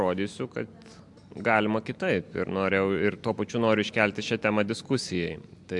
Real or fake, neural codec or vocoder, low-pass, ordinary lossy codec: real; none; 10.8 kHz; MP3, 64 kbps